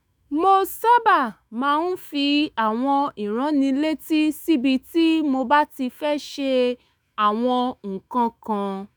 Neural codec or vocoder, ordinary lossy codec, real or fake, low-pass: autoencoder, 48 kHz, 128 numbers a frame, DAC-VAE, trained on Japanese speech; none; fake; none